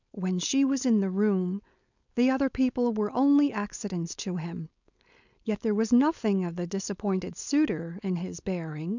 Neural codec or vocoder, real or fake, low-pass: codec, 16 kHz, 4.8 kbps, FACodec; fake; 7.2 kHz